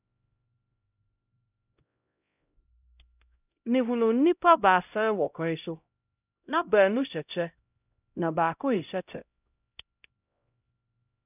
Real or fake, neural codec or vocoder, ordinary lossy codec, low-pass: fake; codec, 16 kHz, 0.5 kbps, X-Codec, HuBERT features, trained on LibriSpeech; none; 3.6 kHz